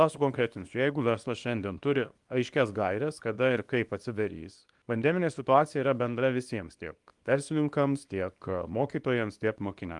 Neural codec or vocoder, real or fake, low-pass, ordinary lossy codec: codec, 24 kHz, 0.9 kbps, WavTokenizer, small release; fake; 10.8 kHz; Opus, 32 kbps